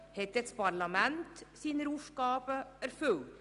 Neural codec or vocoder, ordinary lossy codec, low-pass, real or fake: none; none; 10.8 kHz; real